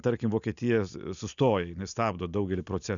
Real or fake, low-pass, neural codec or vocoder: real; 7.2 kHz; none